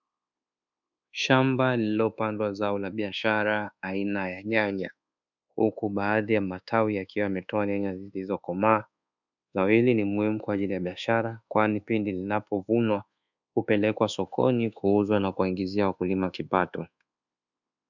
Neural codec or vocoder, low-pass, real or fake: codec, 24 kHz, 1.2 kbps, DualCodec; 7.2 kHz; fake